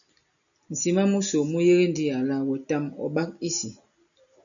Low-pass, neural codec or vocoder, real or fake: 7.2 kHz; none; real